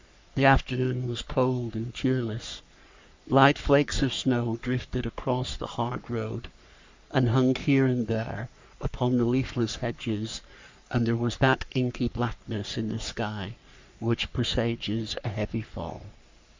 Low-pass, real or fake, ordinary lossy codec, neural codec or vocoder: 7.2 kHz; fake; MP3, 64 kbps; codec, 44.1 kHz, 3.4 kbps, Pupu-Codec